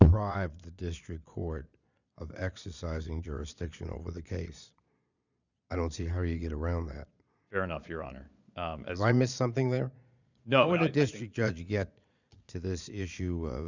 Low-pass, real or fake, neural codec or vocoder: 7.2 kHz; fake; vocoder, 22.05 kHz, 80 mel bands, Vocos